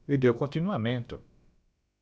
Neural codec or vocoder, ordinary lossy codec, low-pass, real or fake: codec, 16 kHz, about 1 kbps, DyCAST, with the encoder's durations; none; none; fake